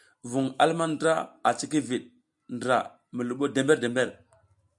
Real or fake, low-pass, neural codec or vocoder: real; 10.8 kHz; none